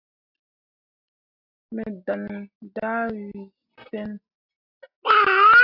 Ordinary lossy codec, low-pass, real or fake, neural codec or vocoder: AAC, 48 kbps; 5.4 kHz; real; none